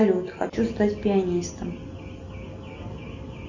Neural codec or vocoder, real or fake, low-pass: none; real; 7.2 kHz